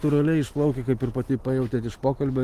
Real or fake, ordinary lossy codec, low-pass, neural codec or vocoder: real; Opus, 16 kbps; 14.4 kHz; none